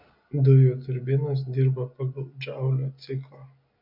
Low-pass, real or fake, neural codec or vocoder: 5.4 kHz; real; none